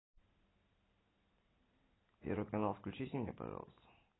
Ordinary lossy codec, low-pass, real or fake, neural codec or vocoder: AAC, 16 kbps; 7.2 kHz; real; none